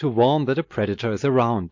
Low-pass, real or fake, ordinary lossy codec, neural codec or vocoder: 7.2 kHz; real; MP3, 48 kbps; none